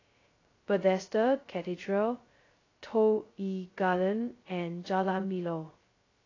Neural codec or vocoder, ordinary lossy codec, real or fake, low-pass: codec, 16 kHz, 0.2 kbps, FocalCodec; AAC, 32 kbps; fake; 7.2 kHz